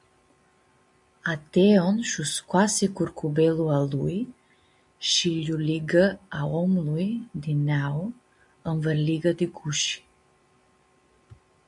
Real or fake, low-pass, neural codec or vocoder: real; 10.8 kHz; none